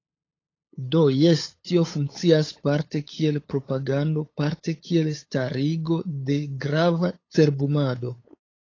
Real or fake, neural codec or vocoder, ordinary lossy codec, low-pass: fake; codec, 16 kHz, 8 kbps, FunCodec, trained on LibriTTS, 25 frames a second; AAC, 32 kbps; 7.2 kHz